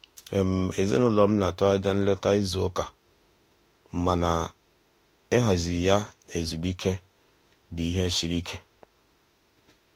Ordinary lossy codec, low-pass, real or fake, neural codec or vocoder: AAC, 48 kbps; 19.8 kHz; fake; autoencoder, 48 kHz, 32 numbers a frame, DAC-VAE, trained on Japanese speech